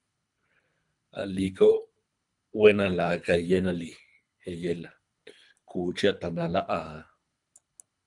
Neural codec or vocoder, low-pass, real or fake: codec, 24 kHz, 3 kbps, HILCodec; 10.8 kHz; fake